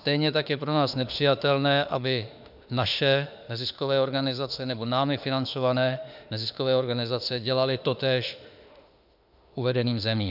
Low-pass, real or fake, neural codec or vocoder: 5.4 kHz; fake; autoencoder, 48 kHz, 32 numbers a frame, DAC-VAE, trained on Japanese speech